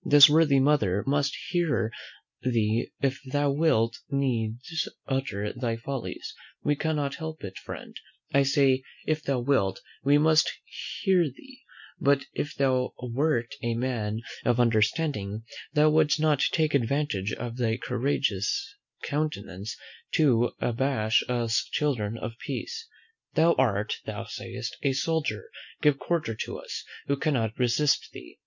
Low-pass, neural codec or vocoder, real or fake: 7.2 kHz; none; real